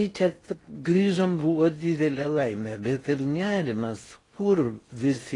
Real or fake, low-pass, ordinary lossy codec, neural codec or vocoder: fake; 10.8 kHz; AAC, 32 kbps; codec, 16 kHz in and 24 kHz out, 0.6 kbps, FocalCodec, streaming, 4096 codes